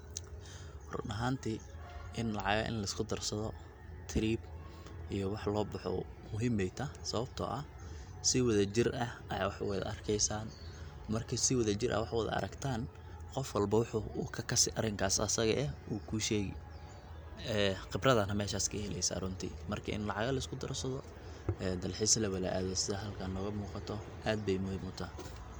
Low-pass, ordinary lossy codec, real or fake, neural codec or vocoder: none; none; real; none